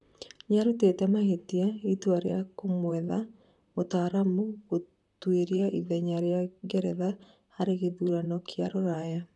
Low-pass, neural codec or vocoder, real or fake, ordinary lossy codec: 10.8 kHz; vocoder, 44.1 kHz, 128 mel bands, Pupu-Vocoder; fake; none